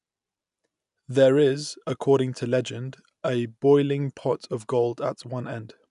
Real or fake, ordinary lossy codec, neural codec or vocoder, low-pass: real; none; none; 10.8 kHz